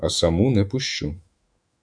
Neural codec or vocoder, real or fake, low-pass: autoencoder, 48 kHz, 128 numbers a frame, DAC-VAE, trained on Japanese speech; fake; 9.9 kHz